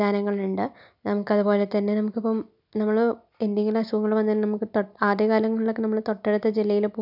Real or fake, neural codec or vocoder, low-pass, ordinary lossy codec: real; none; 5.4 kHz; none